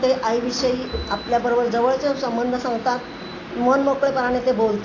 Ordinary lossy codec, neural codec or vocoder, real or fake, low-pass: AAC, 32 kbps; none; real; 7.2 kHz